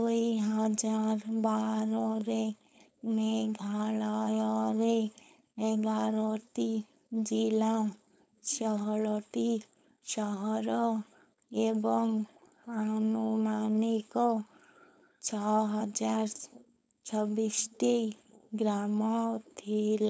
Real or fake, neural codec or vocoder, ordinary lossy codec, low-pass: fake; codec, 16 kHz, 4.8 kbps, FACodec; none; none